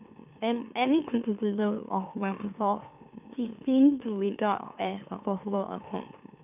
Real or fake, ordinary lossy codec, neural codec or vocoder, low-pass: fake; none; autoencoder, 44.1 kHz, a latent of 192 numbers a frame, MeloTTS; 3.6 kHz